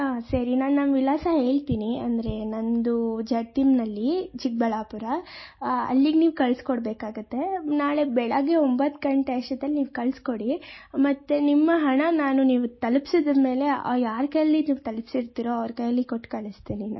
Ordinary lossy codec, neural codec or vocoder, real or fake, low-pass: MP3, 24 kbps; none; real; 7.2 kHz